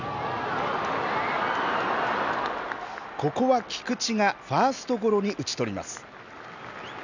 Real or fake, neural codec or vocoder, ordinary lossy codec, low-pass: real; none; none; 7.2 kHz